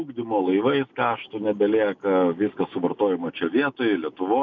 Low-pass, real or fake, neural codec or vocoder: 7.2 kHz; real; none